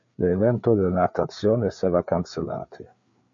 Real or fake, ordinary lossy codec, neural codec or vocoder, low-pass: fake; MP3, 48 kbps; codec, 16 kHz, 4 kbps, FreqCodec, larger model; 7.2 kHz